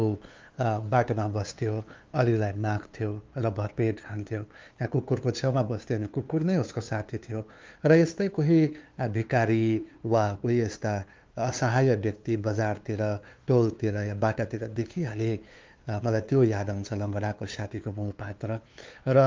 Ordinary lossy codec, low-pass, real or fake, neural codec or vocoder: Opus, 32 kbps; 7.2 kHz; fake; codec, 16 kHz, 2 kbps, FunCodec, trained on LibriTTS, 25 frames a second